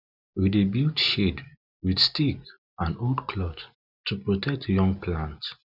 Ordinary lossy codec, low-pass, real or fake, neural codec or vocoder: none; 5.4 kHz; real; none